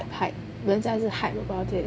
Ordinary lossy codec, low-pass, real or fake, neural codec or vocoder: none; none; real; none